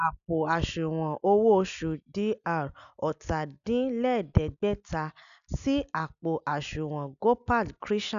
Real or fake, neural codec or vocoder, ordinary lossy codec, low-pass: real; none; none; 7.2 kHz